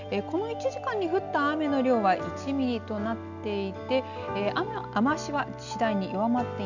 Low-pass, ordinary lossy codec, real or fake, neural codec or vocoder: 7.2 kHz; none; real; none